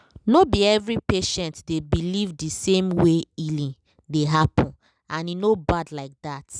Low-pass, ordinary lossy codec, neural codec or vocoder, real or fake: 9.9 kHz; none; none; real